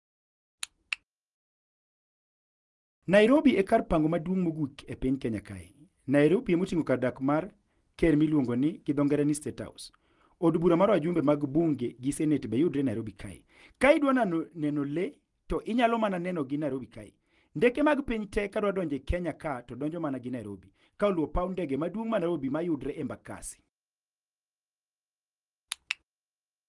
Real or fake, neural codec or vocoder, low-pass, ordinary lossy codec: real; none; 10.8 kHz; Opus, 24 kbps